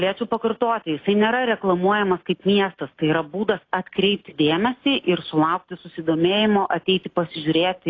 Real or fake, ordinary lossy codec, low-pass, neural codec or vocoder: real; AAC, 32 kbps; 7.2 kHz; none